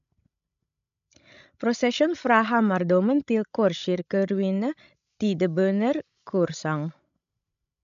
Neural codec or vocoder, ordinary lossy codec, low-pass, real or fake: codec, 16 kHz, 16 kbps, FreqCodec, larger model; MP3, 64 kbps; 7.2 kHz; fake